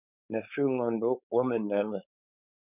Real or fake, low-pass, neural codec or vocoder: fake; 3.6 kHz; codec, 16 kHz, 4.8 kbps, FACodec